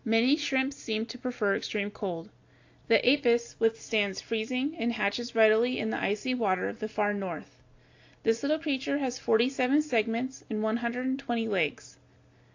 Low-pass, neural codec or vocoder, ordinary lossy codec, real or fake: 7.2 kHz; none; AAC, 48 kbps; real